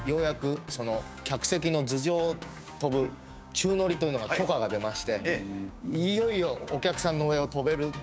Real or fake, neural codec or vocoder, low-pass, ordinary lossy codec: fake; codec, 16 kHz, 6 kbps, DAC; none; none